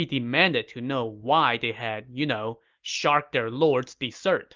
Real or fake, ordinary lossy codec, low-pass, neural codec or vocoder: real; Opus, 32 kbps; 7.2 kHz; none